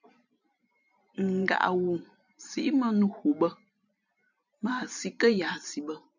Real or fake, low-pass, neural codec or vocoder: fake; 7.2 kHz; vocoder, 44.1 kHz, 128 mel bands every 512 samples, BigVGAN v2